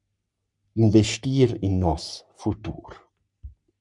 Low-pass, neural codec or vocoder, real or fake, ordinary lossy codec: 10.8 kHz; codec, 44.1 kHz, 3.4 kbps, Pupu-Codec; fake; MP3, 96 kbps